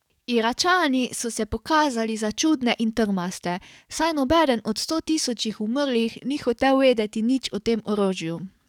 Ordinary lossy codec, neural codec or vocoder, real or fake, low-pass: none; codec, 44.1 kHz, 7.8 kbps, DAC; fake; 19.8 kHz